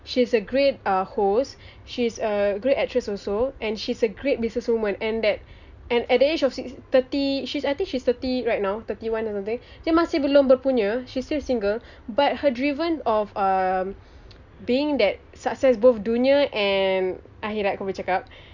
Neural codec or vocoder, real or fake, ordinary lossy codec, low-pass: none; real; none; 7.2 kHz